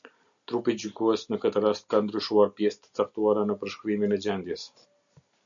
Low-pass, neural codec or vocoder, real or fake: 7.2 kHz; none; real